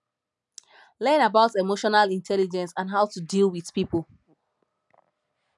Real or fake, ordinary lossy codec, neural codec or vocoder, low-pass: real; none; none; 10.8 kHz